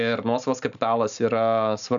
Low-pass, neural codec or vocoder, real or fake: 7.2 kHz; none; real